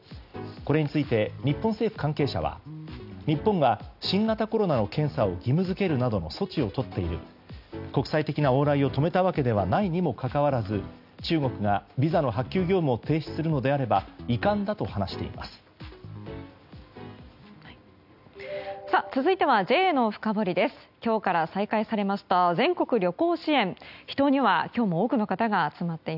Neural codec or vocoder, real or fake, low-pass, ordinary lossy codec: none; real; 5.4 kHz; none